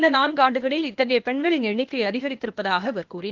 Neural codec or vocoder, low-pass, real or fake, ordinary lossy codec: codec, 16 kHz, 0.8 kbps, ZipCodec; 7.2 kHz; fake; Opus, 32 kbps